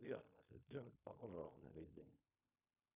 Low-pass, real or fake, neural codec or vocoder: 3.6 kHz; fake; codec, 16 kHz in and 24 kHz out, 0.4 kbps, LongCat-Audio-Codec, fine tuned four codebook decoder